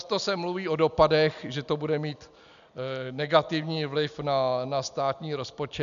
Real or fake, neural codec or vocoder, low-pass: real; none; 7.2 kHz